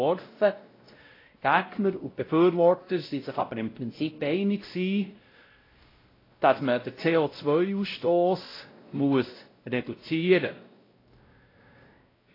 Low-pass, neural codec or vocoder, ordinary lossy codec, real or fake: 5.4 kHz; codec, 16 kHz, 0.5 kbps, X-Codec, WavLM features, trained on Multilingual LibriSpeech; AAC, 24 kbps; fake